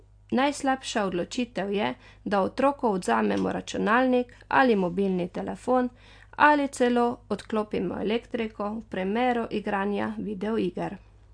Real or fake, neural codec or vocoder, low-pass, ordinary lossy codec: real; none; 9.9 kHz; AAC, 64 kbps